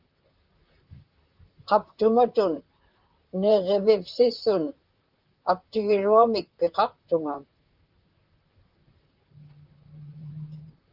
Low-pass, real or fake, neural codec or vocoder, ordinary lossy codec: 5.4 kHz; fake; vocoder, 44.1 kHz, 128 mel bands, Pupu-Vocoder; Opus, 32 kbps